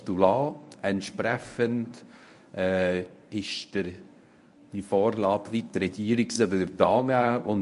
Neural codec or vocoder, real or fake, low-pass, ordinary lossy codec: codec, 24 kHz, 0.9 kbps, WavTokenizer, medium speech release version 1; fake; 10.8 kHz; MP3, 48 kbps